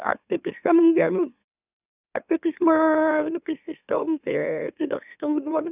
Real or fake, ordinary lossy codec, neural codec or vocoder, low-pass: fake; none; autoencoder, 44.1 kHz, a latent of 192 numbers a frame, MeloTTS; 3.6 kHz